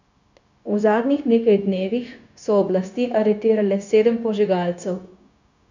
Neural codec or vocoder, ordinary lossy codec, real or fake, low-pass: codec, 16 kHz, 0.9 kbps, LongCat-Audio-Codec; none; fake; 7.2 kHz